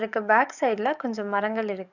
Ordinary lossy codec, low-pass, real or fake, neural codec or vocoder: none; 7.2 kHz; fake; codec, 44.1 kHz, 7.8 kbps, DAC